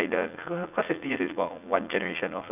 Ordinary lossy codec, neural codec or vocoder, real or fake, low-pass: none; vocoder, 44.1 kHz, 80 mel bands, Vocos; fake; 3.6 kHz